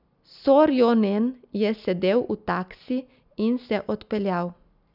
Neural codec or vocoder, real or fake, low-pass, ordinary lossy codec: none; real; 5.4 kHz; none